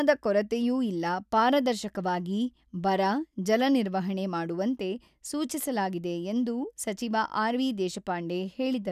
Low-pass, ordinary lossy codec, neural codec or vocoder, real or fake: 14.4 kHz; none; none; real